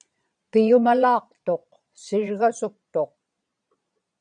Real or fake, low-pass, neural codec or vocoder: fake; 9.9 kHz; vocoder, 22.05 kHz, 80 mel bands, Vocos